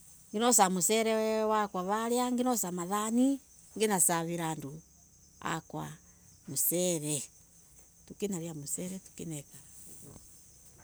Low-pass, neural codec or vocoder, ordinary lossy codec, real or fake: none; none; none; real